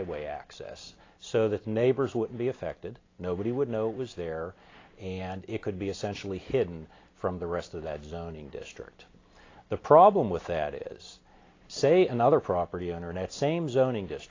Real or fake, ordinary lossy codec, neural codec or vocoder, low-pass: real; AAC, 32 kbps; none; 7.2 kHz